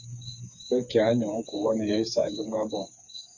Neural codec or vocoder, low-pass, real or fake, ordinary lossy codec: codec, 16 kHz, 4 kbps, FreqCodec, smaller model; 7.2 kHz; fake; Opus, 64 kbps